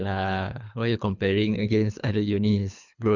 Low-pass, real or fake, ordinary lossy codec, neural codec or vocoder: 7.2 kHz; fake; none; codec, 24 kHz, 3 kbps, HILCodec